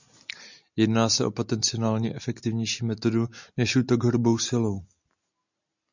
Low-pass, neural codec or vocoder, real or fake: 7.2 kHz; none; real